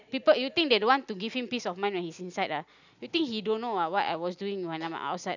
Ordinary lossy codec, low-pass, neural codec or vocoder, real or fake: none; 7.2 kHz; none; real